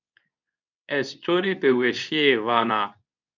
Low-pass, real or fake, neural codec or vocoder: 7.2 kHz; fake; codec, 24 kHz, 0.9 kbps, WavTokenizer, medium speech release version 2